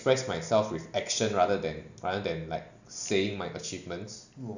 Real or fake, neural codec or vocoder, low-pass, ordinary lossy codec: real; none; 7.2 kHz; none